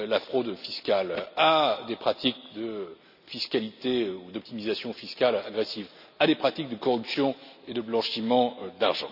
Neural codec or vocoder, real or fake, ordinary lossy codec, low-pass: none; real; none; 5.4 kHz